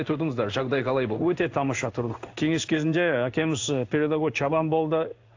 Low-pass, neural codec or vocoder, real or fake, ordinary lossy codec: 7.2 kHz; codec, 16 kHz in and 24 kHz out, 1 kbps, XY-Tokenizer; fake; AAC, 48 kbps